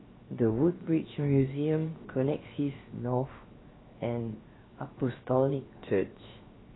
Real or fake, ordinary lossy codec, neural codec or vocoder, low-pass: fake; AAC, 16 kbps; codec, 16 kHz, 0.7 kbps, FocalCodec; 7.2 kHz